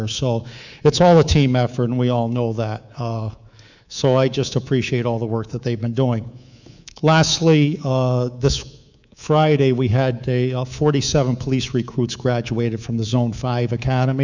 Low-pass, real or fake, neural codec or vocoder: 7.2 kHz; fake; codec, 24 kHz, 3.1 kbps, DualCodec